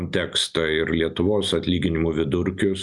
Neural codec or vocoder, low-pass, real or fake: none; 10.8 kHz; real